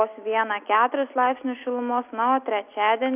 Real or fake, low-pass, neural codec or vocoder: real; 3.6 kHz; none